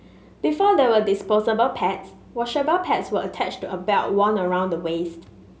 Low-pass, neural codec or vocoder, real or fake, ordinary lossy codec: none; none; real; none